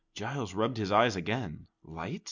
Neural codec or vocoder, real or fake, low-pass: none; real; 7.2 kHz